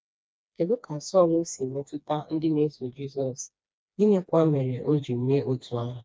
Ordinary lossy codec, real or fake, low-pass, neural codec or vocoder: none; fake; none; codec, 16 kHz, 2 kbps, FreqCodec, smaller model